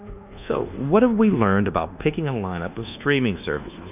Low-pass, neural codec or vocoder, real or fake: 3.6 kHz; codec, 24 kHz, 1.2 kbps, DualCodec; fake